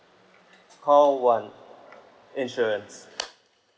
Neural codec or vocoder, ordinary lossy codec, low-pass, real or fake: none; none; none; real